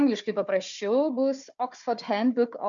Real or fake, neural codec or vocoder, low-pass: fake; codec, 16 kHz, 4 kbps, FunCodec, trained on Chinese and English, 50 frames a second; 7.2 kHz